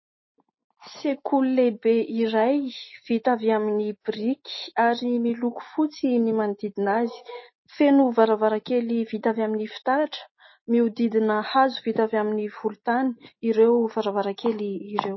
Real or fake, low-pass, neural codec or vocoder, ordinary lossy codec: real; 7.2 kHz; none; MP3, 24 kbps